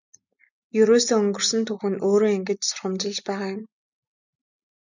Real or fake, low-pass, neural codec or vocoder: real; 7.2 kHz; none